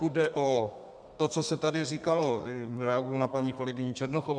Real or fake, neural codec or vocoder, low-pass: fake; codec, 32 kHz, 1.9 kbps, SNAC; 9.9 kHz